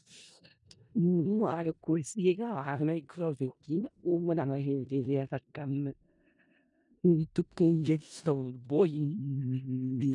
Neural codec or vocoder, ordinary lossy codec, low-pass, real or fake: codec, 16 kHz in and 24 kHz out, 0.4 kbps, LongCat-Audio-Codec, four codebook decoder; none; 10.8 kHz; fake